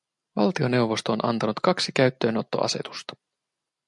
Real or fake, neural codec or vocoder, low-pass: real; none; 10.8 kHz